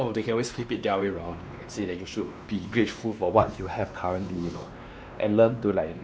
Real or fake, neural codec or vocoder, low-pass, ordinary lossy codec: fake; codec, 16 kHz, 2 kbps, X-Codec, WavLM features, trained on Multilingual LibriSpeech; none; none